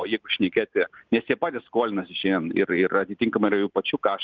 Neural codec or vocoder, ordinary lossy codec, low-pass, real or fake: none; Opus, 32 kbps; 7.2 kHz; real